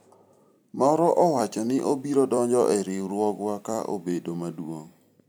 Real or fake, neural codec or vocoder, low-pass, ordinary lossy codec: fake; vocoder, 44.1 kHz, 128 mel bands every 256 samples, BigVGAN v2; none; none